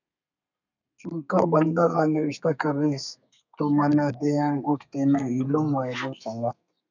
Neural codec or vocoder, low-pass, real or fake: codec, 32 kHz, 1.9 kbps, SNAC; 7.2 kHz; fake